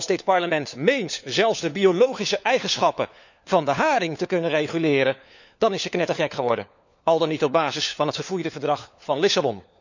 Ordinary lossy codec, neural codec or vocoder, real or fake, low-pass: none; codec, 16 kHz, 4 kbps, FunCodec, trained on LibriTTS, 50 frames a second; fake; 7.2 kHz